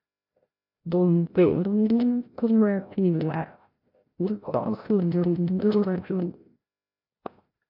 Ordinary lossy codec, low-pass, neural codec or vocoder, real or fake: MP3, 48 kbps; 5.4 kHz; codec, 16 kHz, 0.5 kbps, FreqCodec, larger model; fake